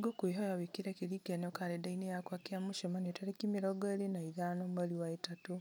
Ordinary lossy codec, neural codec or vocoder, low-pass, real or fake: none; none; none; real